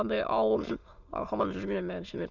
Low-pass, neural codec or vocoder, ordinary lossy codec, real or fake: 7.2 kHz; autoencoder, 22.05 kHz, a latent of 192 numbers a frame, VITS, trained on many speakers; none; fake